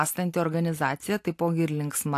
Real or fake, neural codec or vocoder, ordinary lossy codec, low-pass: real; none; AAC, 64 kbps; 14.4 kHz